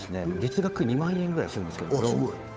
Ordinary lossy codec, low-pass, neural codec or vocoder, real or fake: none; none; codec, 16 kHz, 8 kbps, FunCodec, trained on Chinese and English, 25 frames a second; fake